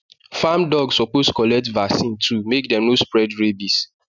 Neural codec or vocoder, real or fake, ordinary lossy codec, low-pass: none; real; none; 7.2 kHz